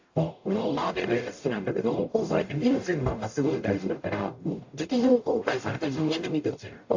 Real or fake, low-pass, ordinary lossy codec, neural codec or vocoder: fake; 7.2 kHz; AAC, 48 kbps; codec, 44.1 kHz, 0.9 kbps, DAC